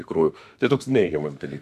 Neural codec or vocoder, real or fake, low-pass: autoencoder, 48 kHz, 32 numbers a frame, DAC-VAE, trained on Japanese speech; fake; 14.4 kHz